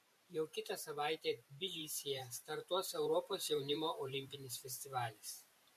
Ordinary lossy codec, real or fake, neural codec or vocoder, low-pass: MP3, 64 kbps; fake; vocoder, 44.1 kHz, 128 mel bands every 512 samples, BigVGAN v2; 14.4 kHz